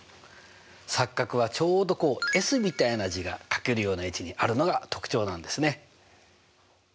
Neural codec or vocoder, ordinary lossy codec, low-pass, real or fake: none; none; none; real